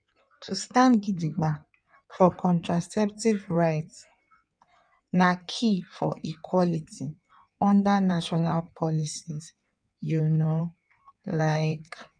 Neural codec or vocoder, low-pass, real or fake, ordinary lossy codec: codec, 16 kHz in and 24 kHz out, 1.1 kbps, FireRedTTS-2 codec; 9.9 kHz; fake; none